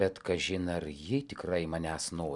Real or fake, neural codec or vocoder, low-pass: real; none; 10.8 kHz